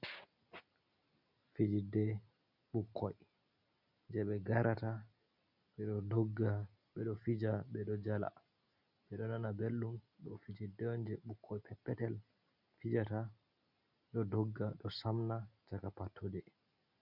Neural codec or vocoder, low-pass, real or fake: none; 5.4 kHz; real